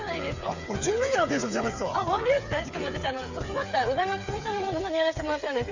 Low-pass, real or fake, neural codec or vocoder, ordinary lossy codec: 7.2 kHz; fake; codec, 16 kHz, 8 kbps, FreqCodec, smaller model; Opus, 64 kbps